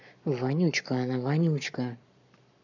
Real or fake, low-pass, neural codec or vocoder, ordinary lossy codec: fake; 7.2 kHz; codec, 44.1 kHz, 7.8 kbps, Pupu-Codec; none